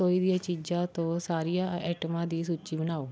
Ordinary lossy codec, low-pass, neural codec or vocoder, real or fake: none; none; none; real